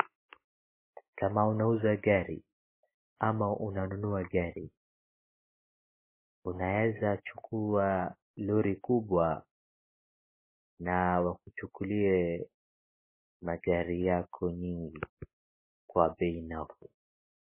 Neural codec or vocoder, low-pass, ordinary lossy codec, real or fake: none; 3.6 kHz; MP3, 16 kbps; real